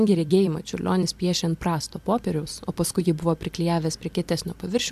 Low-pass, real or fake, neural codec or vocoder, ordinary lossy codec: 14.4 kHz; fake; vocoder, 44.1 kHz, 128 mel bands every 256 samples, BigVGAN v2; MP3, 96 kbps